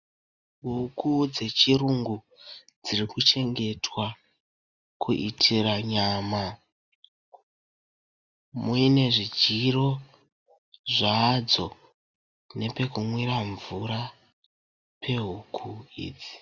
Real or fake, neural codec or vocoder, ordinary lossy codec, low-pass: real; none; Opus, 64 kbps; 7.2 kHz